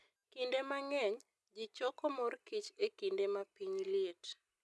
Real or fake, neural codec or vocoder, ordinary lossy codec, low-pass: real; none; none; none